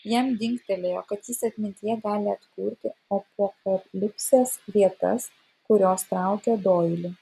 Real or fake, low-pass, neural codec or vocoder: real; 14.4 kHz; none